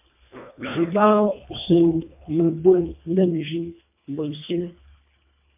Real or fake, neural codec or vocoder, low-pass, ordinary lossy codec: fake; codec, 24 kHz, 1.5 kbps, HILCodec; 3.6 kHz; MP3, 32 kbps